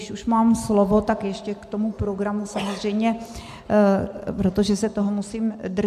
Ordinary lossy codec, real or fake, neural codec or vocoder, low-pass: MP3, 96 kbps; real; none; 14.4 kHz